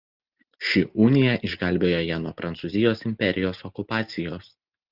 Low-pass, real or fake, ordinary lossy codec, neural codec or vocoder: 5.4 kHz; real; Opus, 32 kbps; none